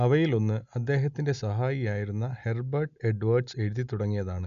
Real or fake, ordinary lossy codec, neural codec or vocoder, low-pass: real; none; none; 7.2 kHz